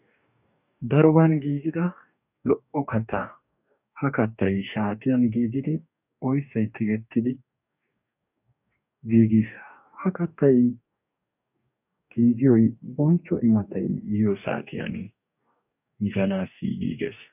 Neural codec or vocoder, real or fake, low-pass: codec, 44.1 kHz, 2.6 kbps, DAC; fake; 3.6 kHz